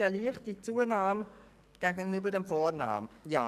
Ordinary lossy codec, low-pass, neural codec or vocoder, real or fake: none; 14.4 kHz; codec, 44.1 kHz, 2.6 kbps, SNAC; fake